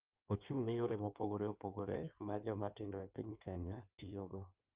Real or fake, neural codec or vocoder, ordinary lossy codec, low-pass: fake; codec, 16 kHz in and 24 kHz out, 1.1 kbps, FireRedTTS-2 codec; Opus, 24 kbps; 3.6 kHz